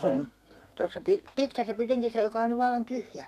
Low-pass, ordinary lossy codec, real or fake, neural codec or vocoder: 14.4 kHz; none; fake; codec, 32 kHz, 1.9 kbps, SNAC